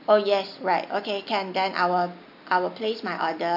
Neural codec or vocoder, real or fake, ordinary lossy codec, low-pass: none; real; none; 5.4 kHz